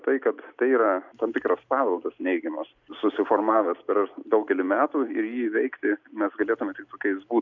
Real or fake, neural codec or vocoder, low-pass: real; none; 7.2 kHz